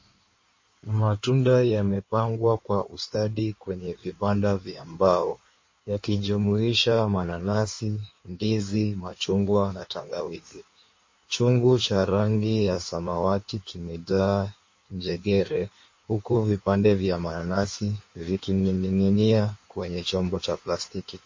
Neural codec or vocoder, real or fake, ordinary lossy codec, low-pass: codec, 16 kHz in and 24 kHz out, 1.1 kbps, FireRedTTS-2 codec; fake; MP3, 32 kbps; 7.2 kHz